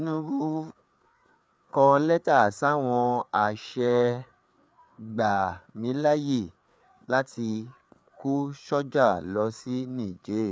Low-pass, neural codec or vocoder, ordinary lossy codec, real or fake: none; codec, 16 kHz, 4 kbps, FreqCodec, larger model; none; fake